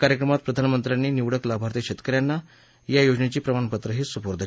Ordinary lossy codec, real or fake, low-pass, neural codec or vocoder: none; real; none; none